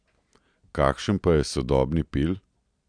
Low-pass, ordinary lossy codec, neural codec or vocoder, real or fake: 9.9 kHz; none; none; real